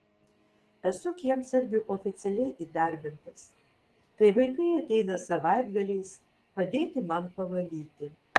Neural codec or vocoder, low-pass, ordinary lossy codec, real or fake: codec, 44.1 kHz, 2.6 kbps, SNAC; 14.4 kHz; Opus, 24 kbps; fake